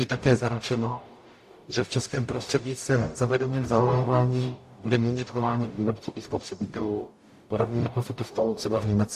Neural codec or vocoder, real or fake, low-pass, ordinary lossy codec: codec, 44.1 kHz, 0.9 kbps, DAC; fake; 14.4 kHz; AAC, 64 kbps